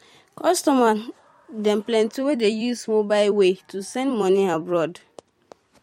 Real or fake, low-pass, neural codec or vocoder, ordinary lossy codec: fake; 19.8 kHz; vocoder, 44.1 kHz, 128 mel bands every 256 samples, BigVGAN v2; MP3, 64 kbps